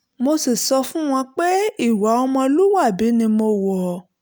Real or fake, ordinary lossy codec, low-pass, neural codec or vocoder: real; none; none; none